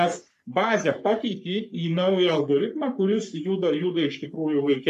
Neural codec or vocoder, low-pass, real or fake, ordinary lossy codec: codec, 44.1 kHz, 3.4 kbps, Pupu-Codec; 14.4 kHz; fake; MP3, 96 kbps